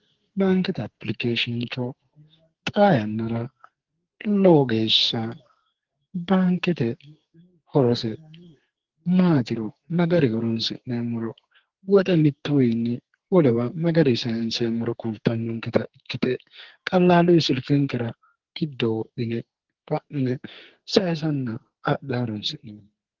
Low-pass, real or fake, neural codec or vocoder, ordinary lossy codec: 7.2 kHz; fake; codec, 44.1 kHz, 2.6 kbps, SNAC; Opus, 16 kbps